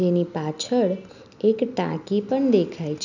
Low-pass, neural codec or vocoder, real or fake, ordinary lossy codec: 7.2 kHz; none; real; none